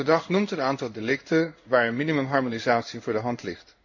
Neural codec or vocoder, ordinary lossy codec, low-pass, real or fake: codec, 16 kHz in and 24 kHz out, 1 kbps, XY-Tokenizer; none; 7.2 kHz; fake